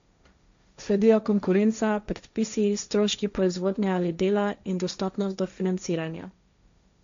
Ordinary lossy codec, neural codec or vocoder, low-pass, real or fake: MP3, 64 kbps; codec, 16 kHz, 1.1 kbps, Voila-Tokenizer; 7.2 kHz; fake